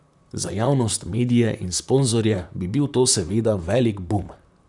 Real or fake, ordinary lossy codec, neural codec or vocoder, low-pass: fake; none; vocoder, 44.1 kHz, 128 mel bands, Pupu-Vocoder; 10.8 kHz